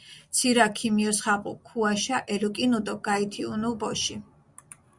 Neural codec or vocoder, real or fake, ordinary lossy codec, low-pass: none; real; Opus, 64 kbps; 10.8 kHz